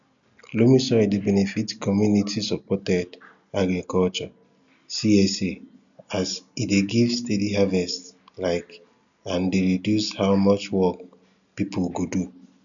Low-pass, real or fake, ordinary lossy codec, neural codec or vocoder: 7.2 kHz; real; none; none